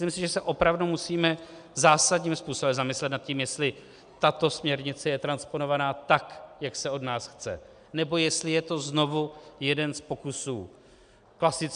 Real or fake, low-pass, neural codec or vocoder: real; 9.9 kHz; none